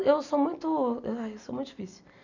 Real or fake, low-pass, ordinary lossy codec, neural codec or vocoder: real; 7.2 kHz; none; none